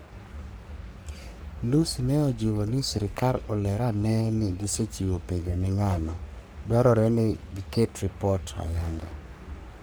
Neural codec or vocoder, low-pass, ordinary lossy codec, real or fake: codec, 44.1 kHz, 3.4 kbps, Pupu-Codec; none; none; fake